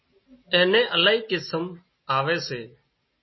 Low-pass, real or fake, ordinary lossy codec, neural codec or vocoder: 7.2 kHz; real; MP3, 24 kbps; none